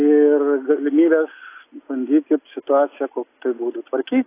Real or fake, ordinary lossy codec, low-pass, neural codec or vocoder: real; AAC, 24 kbps; 3.6 kHz; none